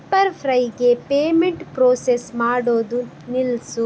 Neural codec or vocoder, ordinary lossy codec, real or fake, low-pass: none; none; real; none